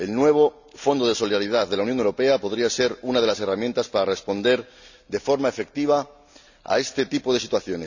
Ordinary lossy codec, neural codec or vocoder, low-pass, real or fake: none; none; 7.2 kHz; real